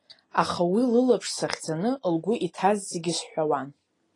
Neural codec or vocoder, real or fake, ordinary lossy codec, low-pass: none; real; AAC, 32 kbps; 10.8 kHz